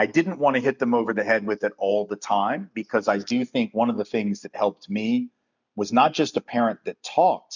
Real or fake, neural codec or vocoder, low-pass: real; none; 7.2 kHz